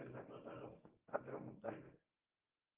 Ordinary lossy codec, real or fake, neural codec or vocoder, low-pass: Opus, 32 kbps; fake; codec, 24 kHz, 0.9 kbps, WavTokenizer, medium speech release version 1; 3.6 kHz